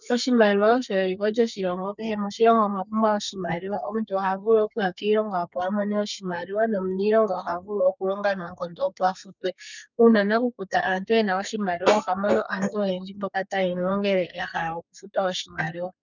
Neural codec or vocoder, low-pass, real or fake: codec, 32 kHz, 1.9 kbps, SNAC; 7.2 kHz; fake